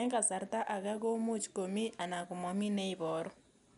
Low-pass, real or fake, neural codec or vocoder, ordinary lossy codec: 10.8 kHz; real; none; none